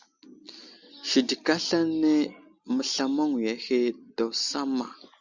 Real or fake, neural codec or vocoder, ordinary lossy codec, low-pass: real; none; Opus, 64 kbps; 7.2 kHz